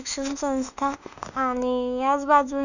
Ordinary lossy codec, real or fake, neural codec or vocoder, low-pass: none; fake; autoencoder, 48 kHz, 32 numbers a frame, DAC-VAE, trained on Japanese speech; 7.2 kHz